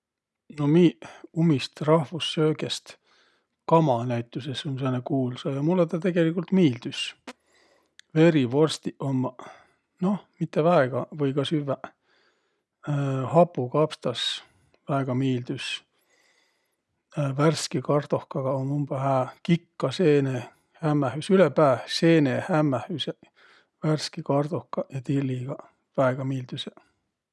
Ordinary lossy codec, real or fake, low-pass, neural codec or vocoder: none; real; none; none